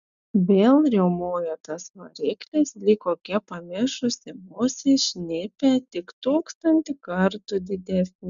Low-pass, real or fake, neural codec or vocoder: 7.2 kHz; real; none